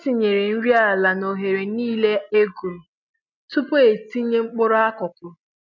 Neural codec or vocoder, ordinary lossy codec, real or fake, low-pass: none; none; real; 7.2 kHz